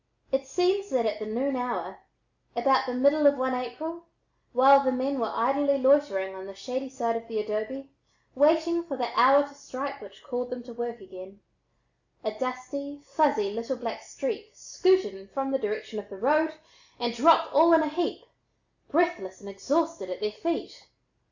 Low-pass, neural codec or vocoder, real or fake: 7.2 kHz; none; real